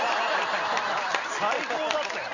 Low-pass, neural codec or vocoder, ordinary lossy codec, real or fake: 7.2 kHz; none; none; real